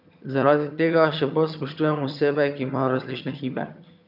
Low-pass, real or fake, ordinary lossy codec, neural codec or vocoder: 5.4 kHz; fake; none; vocoder, 22.05 kHz, 80 mel bands, HiFi-GAN